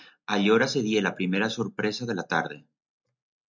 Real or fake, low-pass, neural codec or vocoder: real; 7.2 kHz; none